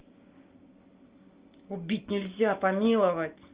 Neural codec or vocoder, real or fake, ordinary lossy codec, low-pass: none; real; Opus, 24 kbps; 3.6 kHz